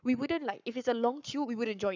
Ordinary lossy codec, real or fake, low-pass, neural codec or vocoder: none; fake; 7.2 kHz; codec, 44.1 kHz, 7.8 kbps, Pupu-Codec